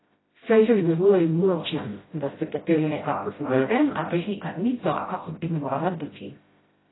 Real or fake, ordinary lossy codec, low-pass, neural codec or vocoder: fake; AAC, 16 kbps; 7.2 kHz; codec, 16 kHz, 0.5 kbps, FreqCodec, smaller model